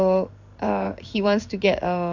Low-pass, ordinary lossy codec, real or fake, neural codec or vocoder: 7.2 kHz; none; fake; codec, 16 kHz in and 24 kHz out, 1 kbps, XY-Tokenizer